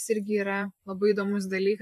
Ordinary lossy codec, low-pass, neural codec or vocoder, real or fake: MP3, 96 kbps; 14.4 kHz; vocoder, 44.1 kHz, 128 mel bands every 512 samples, BigVGAN v2; fake